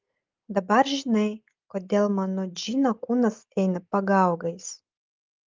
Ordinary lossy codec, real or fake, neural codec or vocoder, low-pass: Opus, 24 kbps; real; none; 7.2 kHz